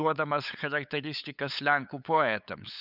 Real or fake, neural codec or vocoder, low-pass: fake; codec, 16 kHz, 8 kbps, FunCodec, trained on LibriTTS, 25 frames a second; 5.4 kHz